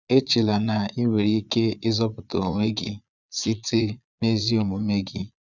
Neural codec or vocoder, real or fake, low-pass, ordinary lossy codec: vocoder, 22.05 kHz, 80 mel bands, Vocos; fake; 7.2 kHz; none